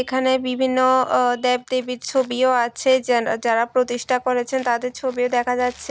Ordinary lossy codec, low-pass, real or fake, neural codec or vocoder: none; none; real; none